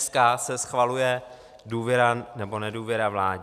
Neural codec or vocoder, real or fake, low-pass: none; real; 14.4 kHz